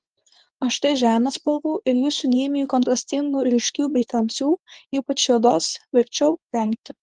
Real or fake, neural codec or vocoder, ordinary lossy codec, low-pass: fake; codec, 24 kHz, 0.9 kbps, WavTokenizer, medium speech release version 2; Opus, 24 kbps; 9.9 kHz